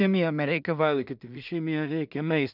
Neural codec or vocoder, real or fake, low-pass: codec, 16 kHz in and 24 kHz out, 0.4 kbps, LongCat-Audio-Codec, two codebook decoder; fake; 5.4 kHz